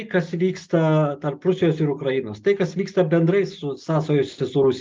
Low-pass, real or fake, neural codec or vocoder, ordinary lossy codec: 7.2 kHz; real; none; Opus, 24 kbps